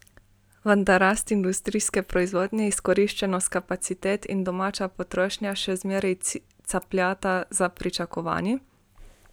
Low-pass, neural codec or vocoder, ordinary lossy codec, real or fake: none; none; none; real